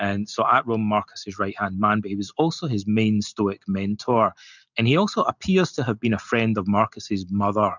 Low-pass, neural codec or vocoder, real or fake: 7.2 kHz; none; real